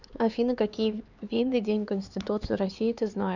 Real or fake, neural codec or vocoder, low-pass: fake; codec, 16 kHz, 4 kbps, X-Codec, HuBERT features, trained on LibriSpeech; 7.2 kHz